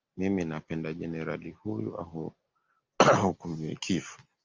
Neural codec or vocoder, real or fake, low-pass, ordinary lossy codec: none; real; 7.2 kHz; Opus, 32 kbps